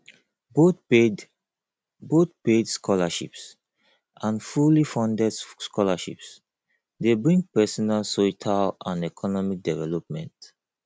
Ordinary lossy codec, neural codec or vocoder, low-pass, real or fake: none; none; none; real